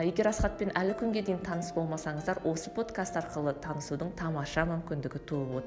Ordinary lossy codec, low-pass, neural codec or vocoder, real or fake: none; none; none; real